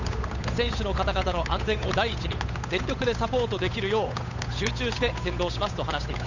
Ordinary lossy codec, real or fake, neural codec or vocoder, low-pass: none; fake; codec, 16 kHz, 8 kbps, FunCodec, trained on Chinese and English, 25 frames a second; 7.2 kHz